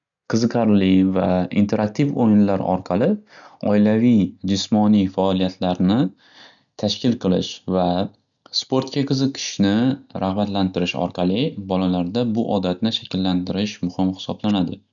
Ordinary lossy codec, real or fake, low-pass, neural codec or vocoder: none; real; 7.2 kHz; none